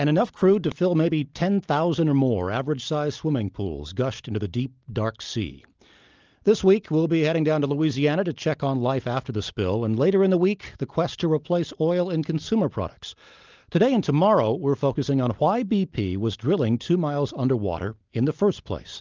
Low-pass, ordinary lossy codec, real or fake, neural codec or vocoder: 7.2 kHz; Opus, 24 kbps; real; none